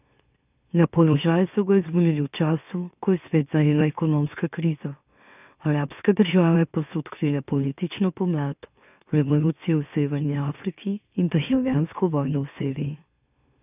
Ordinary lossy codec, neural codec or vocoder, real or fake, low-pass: none; autoencoder, 44.1 kHz, a latent of 192 numbers a frame, MeloTTS; fake; 3.6 kHz